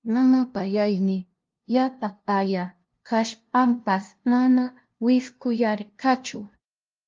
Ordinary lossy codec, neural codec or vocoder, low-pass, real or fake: Opus, 32 kbps; codec, 16 kHz, 0.5 kbps, FunCodec, trained on LibriTTS, 25 frames a second; 7.2 kHz; fake